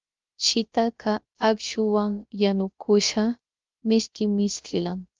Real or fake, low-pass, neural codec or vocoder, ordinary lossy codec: fake; 7.2 kHz; codec, 16 kHz, 0.3 kbps, FocalCodec; Opus, 32 kbps